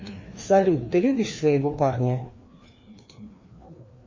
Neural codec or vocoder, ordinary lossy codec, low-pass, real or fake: codec, 16 kHz, 2 kbps, FreqCodec, larger model; MP3, 32 kbps; 7.2 kHz; fake